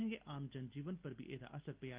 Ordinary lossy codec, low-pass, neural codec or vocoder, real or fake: Opus, 32 kbps; 3.6 kHz; none; real